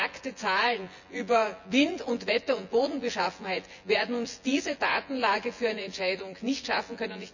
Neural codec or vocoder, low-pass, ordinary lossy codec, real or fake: vocoder, 24 kHz, 100 mel bands, Vocos; 7.2 kHz; none; fake